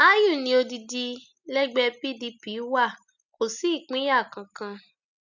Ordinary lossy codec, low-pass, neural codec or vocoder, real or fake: none; 7.2 kHz; none; real